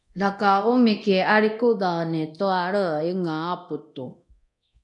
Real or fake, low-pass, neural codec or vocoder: fake; 10.8 kHz; codec, 24 kHz, 0.9 kbps, DualCodec